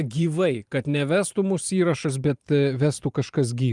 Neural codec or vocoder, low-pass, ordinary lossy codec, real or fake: vocoder, 44.1 kHz, 128 mel bands every 512 samples, BigVGAN v2; 10.8 kHz; Opus, 32 kbps; fake